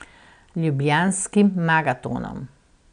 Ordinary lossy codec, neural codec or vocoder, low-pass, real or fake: none; none; 9.9 kHz; real